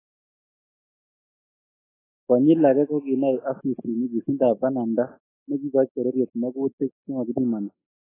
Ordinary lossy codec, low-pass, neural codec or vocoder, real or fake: AAC, 16 kbps; 3.6 kHz; none; real